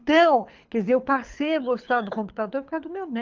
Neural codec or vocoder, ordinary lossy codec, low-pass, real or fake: codec, 24 kHz, 6 kbps, HILCodec; Opus, 32 kbps; 7.2 kHz; fake